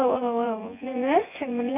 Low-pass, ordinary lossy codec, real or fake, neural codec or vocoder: 3.6 kHz; none; fake; vocoder, 24 kHz, 100 mel bands, Vocos